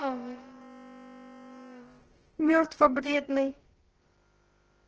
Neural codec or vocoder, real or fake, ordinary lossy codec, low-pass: codec, 16 kHz, about 1 kbps, DyCAST, with the encoder's durations; fake; Opus, 16 kbps; 7.2 kHz